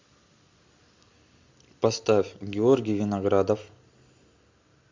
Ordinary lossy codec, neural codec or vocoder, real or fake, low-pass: MP3, 64 kbps; none; real; 7.2 kHz